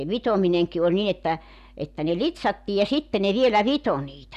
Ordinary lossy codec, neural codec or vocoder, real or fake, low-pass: none; none; real; 14.4 kHz